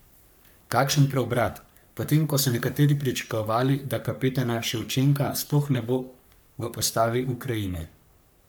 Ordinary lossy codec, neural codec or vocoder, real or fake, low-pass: none; codec, 44.1 kHz, 3.4 kbps, Pupu-Codec; fake; none